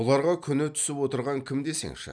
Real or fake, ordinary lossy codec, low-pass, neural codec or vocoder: real; none; 9.9 kHz; none